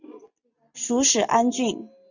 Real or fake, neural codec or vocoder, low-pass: real; none; 7.2 kHz